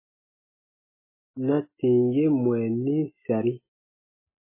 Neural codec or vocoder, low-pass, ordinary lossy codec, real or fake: none; 3.6 kHz; MP3, 16 kbps; real